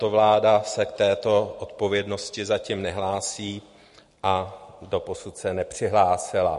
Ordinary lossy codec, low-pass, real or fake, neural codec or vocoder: MP3, 48 kbps; 14.4 kHz; real; none